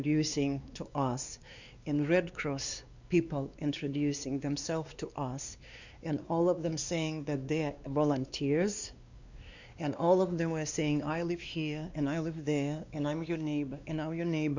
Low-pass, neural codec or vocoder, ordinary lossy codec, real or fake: 7.2 kHz; codec, 16 kHz, 2 kbps, X-Codec, WavLM features, trained on Multilingual LibriSpeech; Opus, 64 kbps; fake